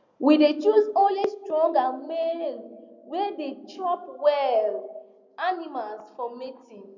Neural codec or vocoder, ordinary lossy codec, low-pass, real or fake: none; none; 7.2 kHz; real